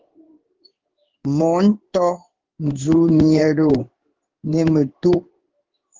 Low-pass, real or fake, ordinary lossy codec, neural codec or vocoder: 7.2 kHz; fake; Opus, 16 kbps; codec, 16 kHz in and 24 kHz out, 1 kbps, XY-Tokenizer